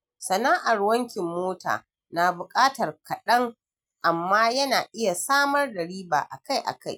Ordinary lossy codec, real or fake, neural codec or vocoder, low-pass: none; real; none; none